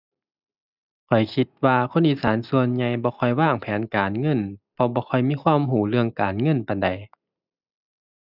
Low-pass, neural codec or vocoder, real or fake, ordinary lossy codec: 5.4 kHz; none; real; none